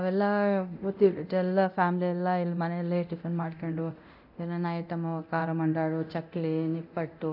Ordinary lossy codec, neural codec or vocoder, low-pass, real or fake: none; codec, 24 kHz, 0.9 kbps, DualCodec; 5.4 kHz; fake